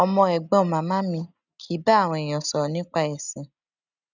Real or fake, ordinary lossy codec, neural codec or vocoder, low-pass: real; none; none; 7.2 kHz